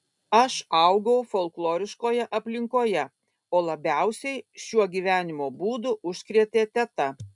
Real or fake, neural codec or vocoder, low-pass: real; none; 10.8 kHz